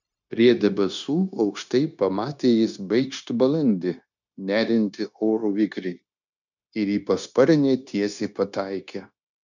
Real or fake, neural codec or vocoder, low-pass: fake; codec, 16 kHz, 0.9 kbps, LongCat-Audio-Codec; 7.2 kHz